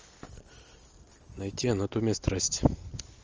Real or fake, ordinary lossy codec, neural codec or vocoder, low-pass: real; Opus, 24 kbps; none; 7.2 kHz